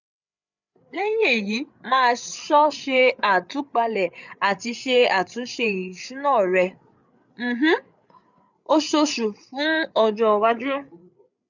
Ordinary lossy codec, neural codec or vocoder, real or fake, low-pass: none; codec, 16 kHz, 8 kbps, FreqCodec, larger model; fake; 7.2 kHz